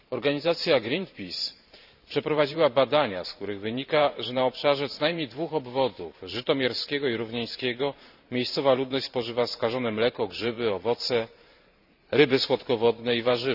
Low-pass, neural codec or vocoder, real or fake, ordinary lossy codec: 5.4 kHz; vocoder, 44.1 kHz, 128 mel bands every 512 samples, BigVGAN v2; fake; none